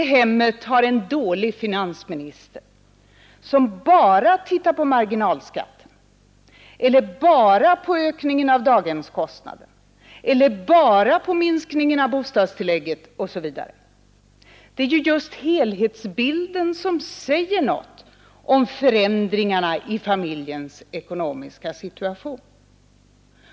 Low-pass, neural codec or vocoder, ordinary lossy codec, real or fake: none; none; none; real